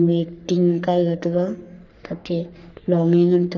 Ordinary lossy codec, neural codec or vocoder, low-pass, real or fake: none; codec, 44.1 kHz, 3.4 kbps, Pupu-Codec; 7.2 kHz; fake